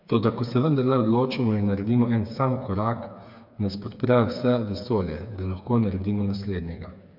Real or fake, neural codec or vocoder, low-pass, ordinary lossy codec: fake; codec, 16 kHz, 4 kbps, FreqCodec, smaller model; 5.4 kHz; none